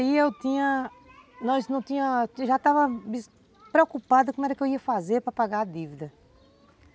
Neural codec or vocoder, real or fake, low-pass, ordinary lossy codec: none; real; none; none